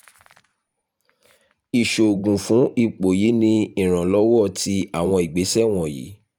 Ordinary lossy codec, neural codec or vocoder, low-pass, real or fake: none; vocoder, 44.1 kHz, 128 mel bands every 512 samples, BigVGAN v2; 19.8 kHz; fake